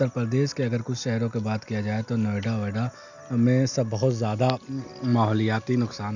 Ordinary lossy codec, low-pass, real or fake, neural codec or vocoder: none; 7.2 kHz; real; none